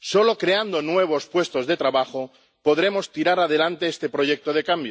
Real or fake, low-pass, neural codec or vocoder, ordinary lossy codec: real; none; none; none